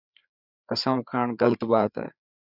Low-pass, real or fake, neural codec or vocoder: 5.4 kHz; fake; codec, 16 kHz, 4 kbps, FreqCodec, larger model